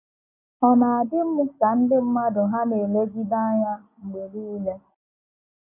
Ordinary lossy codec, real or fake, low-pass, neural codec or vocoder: none; real; 3.6 kHz; none